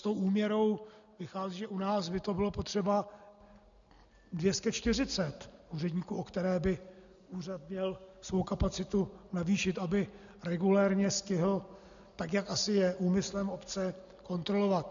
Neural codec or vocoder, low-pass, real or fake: none; 7.2 kHz; real